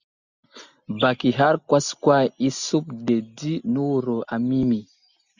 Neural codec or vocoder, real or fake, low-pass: none; real; 7.2 kHz